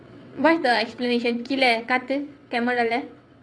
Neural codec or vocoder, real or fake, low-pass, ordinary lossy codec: vocoder, 22.05 kHz, 80 mel bands, WaveNeXt; fake; none; none